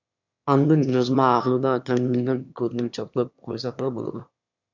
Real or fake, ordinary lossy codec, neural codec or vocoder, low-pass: fake; MP3, 64 kbps; autoencoder, 22.05 kHz, a latent of 192 numbers a frame, VITS, trained on one speaker; 7.2 kHz